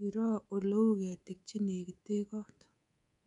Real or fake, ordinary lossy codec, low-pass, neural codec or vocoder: fake; none; 10.8 kHz; codec, 24 kHz, 3.1 kbps, DualCodec